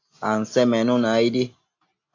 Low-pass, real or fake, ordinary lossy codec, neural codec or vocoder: 7.2 kHz; real; AAC, 48 kbps; none